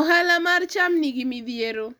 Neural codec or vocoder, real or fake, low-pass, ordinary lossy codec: none; real; none; none